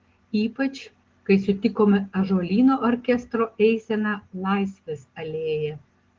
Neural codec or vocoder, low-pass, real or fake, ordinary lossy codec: none; 7.2 kHz; real; Opus, 16 kbps